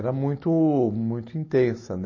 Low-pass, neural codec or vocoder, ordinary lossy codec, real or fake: 7.2 kHz; none; MP3, 32 kbps; real